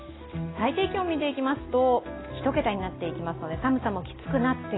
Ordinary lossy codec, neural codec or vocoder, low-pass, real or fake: AAC, 16 kbps; none; 7.2 kHz; real